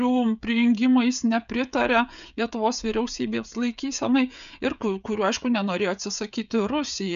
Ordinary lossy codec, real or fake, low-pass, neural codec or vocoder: MP3, 96 kbps; fake; 7.2 kHz; codec, 16 kHz, 16 kbps, FreqCodec, smaller model